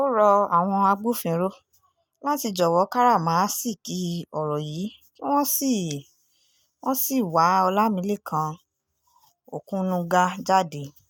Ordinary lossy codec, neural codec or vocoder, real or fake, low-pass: none; none; real; none